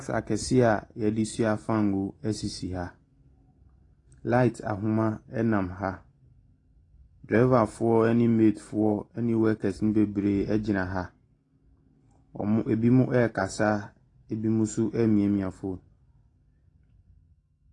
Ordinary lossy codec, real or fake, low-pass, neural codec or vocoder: AAC, 32 kbps; real; 10.8 kHz; none